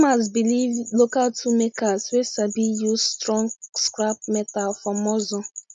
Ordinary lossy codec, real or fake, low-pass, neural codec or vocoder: none; real; none; none